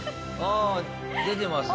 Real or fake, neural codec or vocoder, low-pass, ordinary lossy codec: real; none; none; none